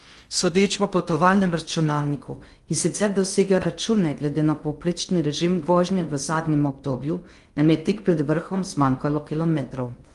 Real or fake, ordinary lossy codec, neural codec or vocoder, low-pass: fake; Opus, 32 kbps; codec, 16 kHz in and 24 kHz out, 0.6 kbps, FocalCodec, streaming, 2048 codes; 10.8 kHz